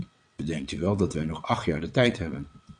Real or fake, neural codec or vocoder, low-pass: fake; vocoder, 22.05 kHz, 80 mel bands, WaveNeXt; 9.9 kHz